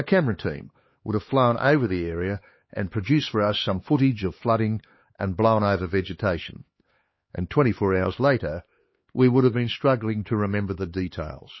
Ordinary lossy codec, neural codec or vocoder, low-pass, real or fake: MP3, 24 kbps; codec, 16 kHz, 4 kbps, X-Codec, HuBERT features, trained on LibriSpeech; 7.2 kHz; fake